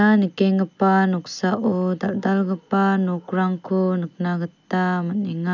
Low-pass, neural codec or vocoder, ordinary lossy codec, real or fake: 7.2 kHz; none; none; real